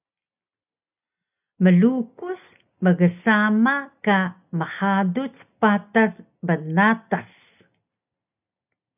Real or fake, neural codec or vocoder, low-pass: real; none; 3.6 kHz